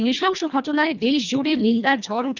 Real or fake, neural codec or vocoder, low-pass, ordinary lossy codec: fake; codec, 24 kHz, 1.5 kbps, HILCodec; 7.2 kHz; none